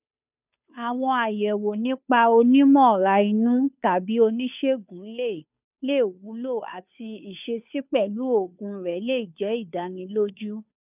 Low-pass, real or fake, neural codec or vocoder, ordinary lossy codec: 3.6 kHz; fake; codec, 16 kHz, 2 kbps, FunCodec, trained on Chinese and English, 25 frames a second; none